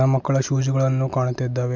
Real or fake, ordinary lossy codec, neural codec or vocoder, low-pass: real; MP3, 64 kbps; none; 7.2 kHz